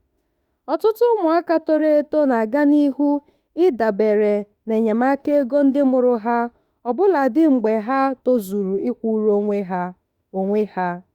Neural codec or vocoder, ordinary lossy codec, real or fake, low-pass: autoencoder, 48 kHz, 32 numbers a frame, DAC-VAE, trained on Japanese speech; Opus, 64 kbps; fake; 19.8 kHz